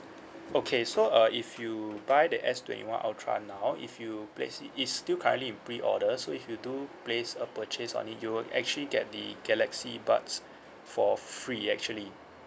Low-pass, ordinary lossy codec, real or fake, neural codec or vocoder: none; none; real; none